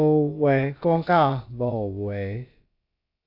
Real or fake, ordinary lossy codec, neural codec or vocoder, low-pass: fake; AAC, 48 kbps; codec, 16 kHz, about 1 kbps, DyCAST, with the encoder's durations; 5.4 kHz